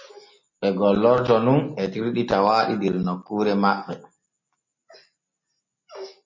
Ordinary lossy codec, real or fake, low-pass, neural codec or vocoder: MP3, 32 kbps; real; 7.2 kHz; none